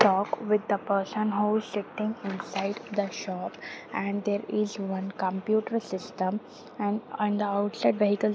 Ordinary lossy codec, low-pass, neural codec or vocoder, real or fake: none; none; none; real